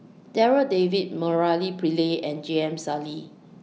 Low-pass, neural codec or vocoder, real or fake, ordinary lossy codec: none; none; real; none